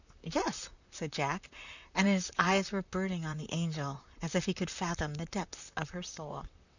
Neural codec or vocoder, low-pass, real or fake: vocoder, 44.1 kHz, 128 mel bands, Pupu-Vocoder; 7.2 kHz; fake